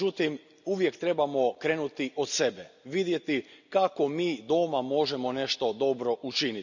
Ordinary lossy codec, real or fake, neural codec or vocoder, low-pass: none; real; none; 7.2 kHz